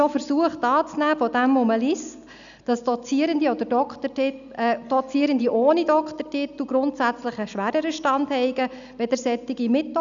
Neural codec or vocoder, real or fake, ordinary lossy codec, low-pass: none; real; none; 7.2 kHz